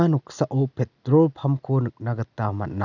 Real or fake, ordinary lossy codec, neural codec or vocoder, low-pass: real; none; none; 7.2 kHz